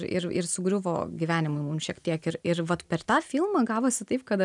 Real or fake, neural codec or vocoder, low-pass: real; none; 10.8 kHz